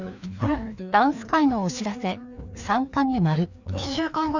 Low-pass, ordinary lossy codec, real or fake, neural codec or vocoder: 7.2 kHz; none; fake; codec, 16 kHz, 2 kbps, FreqCodec, larger model